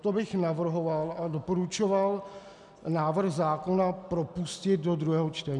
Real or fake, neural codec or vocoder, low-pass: real; none; 10.8 kHz